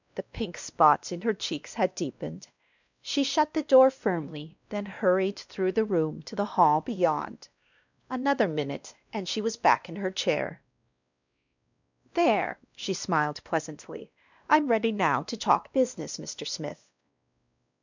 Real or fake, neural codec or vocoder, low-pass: fake; codec, 16 kHz, 1 kbps, X-Codec, WavLM features, trained on Multilingual LibriSpeech; 7.2 kHz